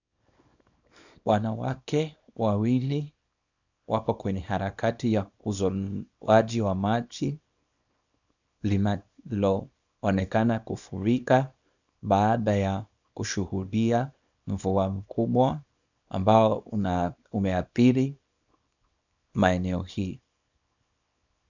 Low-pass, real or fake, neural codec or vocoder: 7.2 kHz; fake; codec, 24 kHz, 0.9 kbps, WavTokenizer, small release